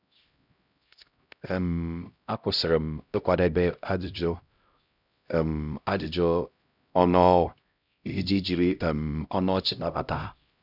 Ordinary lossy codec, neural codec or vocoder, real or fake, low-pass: none; codec, 16 kHz, 0.5 kbps, X-Codec, HuBERT features, trained on LibriSpeech; fake; 5.4 kHz